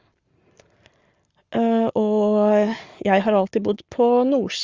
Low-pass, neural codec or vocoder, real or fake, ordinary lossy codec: 7.2 kHz; codec, 44.1 kHz, 7.8 kbps, Pupu-Codec; fake; Opus, 32 kbps